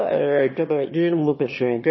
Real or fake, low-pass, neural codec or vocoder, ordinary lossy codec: fake; 7.2 kHz; autoencoder, 22.05 kHz, a latent of 192 numbers a frame, VITS, trained on one speaker; MP3, 24 kbps